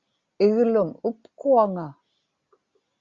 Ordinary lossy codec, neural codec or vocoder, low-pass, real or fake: Opus, 64 kbps; none; 7.2 kHz; real